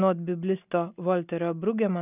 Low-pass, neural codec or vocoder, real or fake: 3.6 kHz; none; real